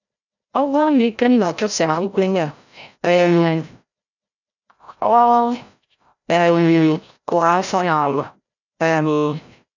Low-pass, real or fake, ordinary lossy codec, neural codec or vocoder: 7.2 kHz; fake; none; codec, 16 kHz, 0.5 kbps, FreqCodec, larger model